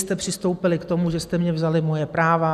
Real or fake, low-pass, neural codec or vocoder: real; 14.4 kHz; none